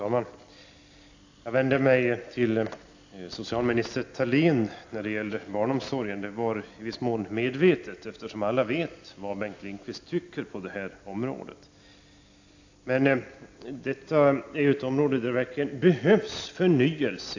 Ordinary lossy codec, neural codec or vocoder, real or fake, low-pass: AAC, 48 kbps; none; real; 7.2 kHz